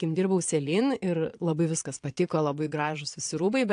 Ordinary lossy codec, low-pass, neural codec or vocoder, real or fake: AAC, 64 kbps; 9.9 kHz; vocoder, 22.05 kHz, 80 mel bands, Vocos; fake